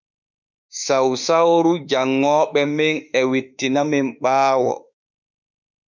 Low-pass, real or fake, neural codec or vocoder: 7.2 kHz; fake; autoencoder, 48 kHz, 32 numbers a frame, DAC-VAE, trained on Japanese speech